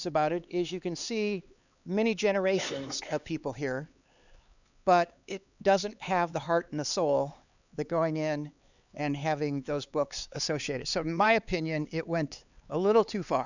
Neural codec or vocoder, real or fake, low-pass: codec, 16 kHz, 4 kbps, X-Codec, HuBERT features, trained on LibriSpeech; fake; 7.2 kHz